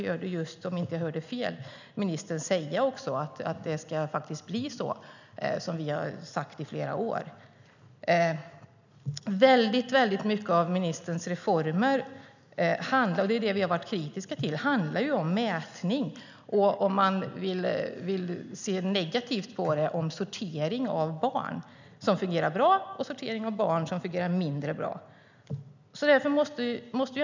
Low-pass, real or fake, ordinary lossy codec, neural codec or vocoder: 7.2 kHz; real; none; none